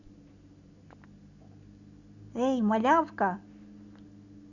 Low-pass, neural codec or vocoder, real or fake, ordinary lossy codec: 7.2 kHz; none; real; none